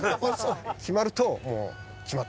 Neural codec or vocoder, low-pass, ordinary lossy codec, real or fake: none; none; none; real